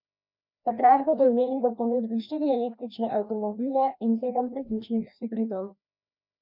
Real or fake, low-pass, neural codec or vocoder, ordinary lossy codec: fake; 5.4 kHz; codec, 16 kHz, 1 kbps, FreqCodec, larger model; MP3, 48 kbps